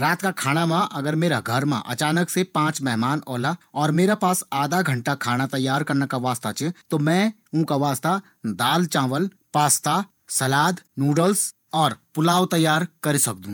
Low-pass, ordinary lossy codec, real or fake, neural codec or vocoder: none; none; fake; vocoder, 48 kHz, 128 mel bands, Vocos